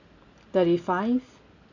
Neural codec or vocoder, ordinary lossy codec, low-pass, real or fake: none; none; 7.2 kHz; real